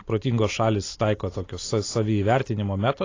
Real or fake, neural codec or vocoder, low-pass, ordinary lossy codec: real; none; 7.2 kHz; AAC, 32 kbps